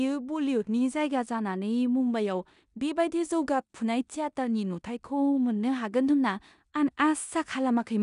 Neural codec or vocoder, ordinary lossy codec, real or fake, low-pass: codec, 16 kHz in and 24 kHz out, 0.9 kbps, LongCat-Audio-Codec, four codebook decoder; none; fake; 10.8 kHz